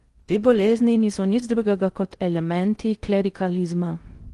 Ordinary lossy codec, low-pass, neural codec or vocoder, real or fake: Opus, 24 kbps; 10.8 kHz; codec, 16 kHz in and 24 kHz out, 0.6 kbps, FocalCodec, streaming, 4096 codes; fake